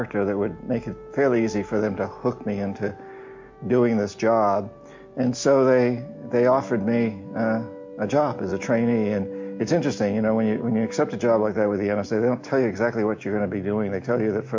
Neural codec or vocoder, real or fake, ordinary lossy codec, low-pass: none; real; MP3, 48 kbps; 7.2 kHz